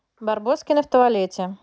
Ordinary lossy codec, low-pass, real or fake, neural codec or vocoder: none; none; real; none